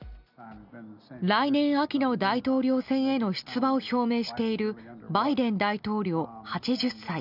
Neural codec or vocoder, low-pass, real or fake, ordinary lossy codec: none; 5.4 kHz; real; none